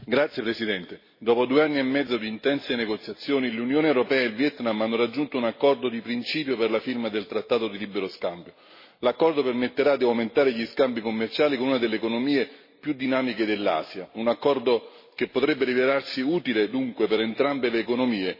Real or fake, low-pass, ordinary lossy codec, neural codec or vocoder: real; 5.4 kHz; MP3, 24 kbps; none